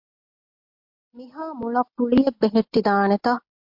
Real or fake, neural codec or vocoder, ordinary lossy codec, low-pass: real; none; MP3, 48 kbps; 5.4 kHz